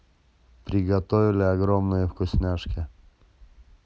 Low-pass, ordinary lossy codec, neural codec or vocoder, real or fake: none; none; none; real